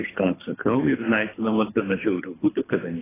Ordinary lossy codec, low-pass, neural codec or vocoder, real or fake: AAC, 16 kbps; 3.6 kHz; codec, 24 kHz, 3 kbps, HILCodec; fake